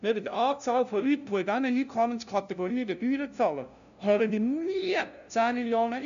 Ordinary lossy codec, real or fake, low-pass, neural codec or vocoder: none; fake; 7.2 kHz; codec, 16 kHz, 0.5 kbps, FunCodec, trained on LibriTTS, 25 frames a second